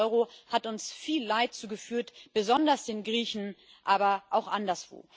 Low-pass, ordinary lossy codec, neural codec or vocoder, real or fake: none; none; none; real